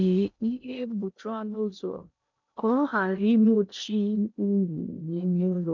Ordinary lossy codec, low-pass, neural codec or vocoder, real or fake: none; 7.2 kHz; codec, 16 kHz in and 24 kHz out, 0.6 kbps, FocalCodec, streaming, 2048 codes; fake